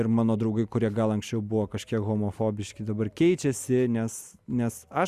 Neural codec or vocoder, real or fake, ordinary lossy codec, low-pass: none; real; Opus, 64 kbps; 14.4 kHz